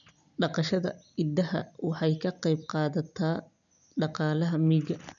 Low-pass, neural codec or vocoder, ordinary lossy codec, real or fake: 7.2 kHz; none; none; real